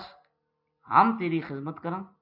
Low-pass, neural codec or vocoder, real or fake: 5.4 kHz; none; real